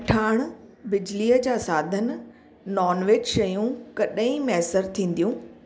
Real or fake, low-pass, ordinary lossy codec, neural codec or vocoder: real; none; none; none